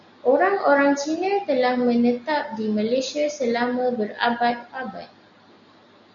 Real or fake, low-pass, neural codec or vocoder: real; 7.2 kHz; none